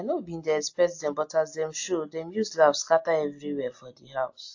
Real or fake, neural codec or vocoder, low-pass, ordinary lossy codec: real; none; 7.2 kHz; AAC, 48 kbps